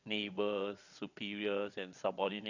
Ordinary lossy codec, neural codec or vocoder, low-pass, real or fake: none; vocoder, 22.05 kHz, 80 mel bands, WaveNeXt; 7.2 kHz; fake